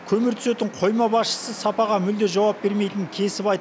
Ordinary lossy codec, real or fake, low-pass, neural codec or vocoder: none; real; none; none